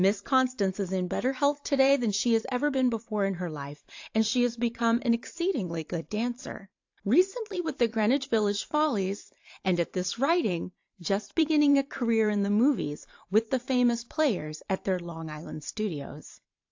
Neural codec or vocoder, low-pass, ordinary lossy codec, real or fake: vocoder, 44.1 kHz, 128 mel bands every 512 samples, BigVGAN v2; 7.2 kHz; AAC, 48 kbps; fake